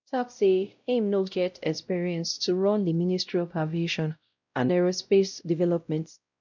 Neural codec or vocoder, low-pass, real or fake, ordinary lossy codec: codec, 16 kHz, 0.5 kbps, X-Codec, WavLM features, trained on Multilingual LibriSpeech; 7.2 kHz; fake; none